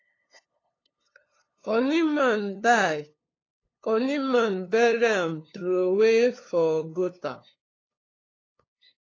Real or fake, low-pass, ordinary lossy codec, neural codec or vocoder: fake; 7.2 kHz; AAC, 32 kbps; codec, 16 kHz, 2 kbps, FunCodec, trained on LibriTTS, 25 frames a second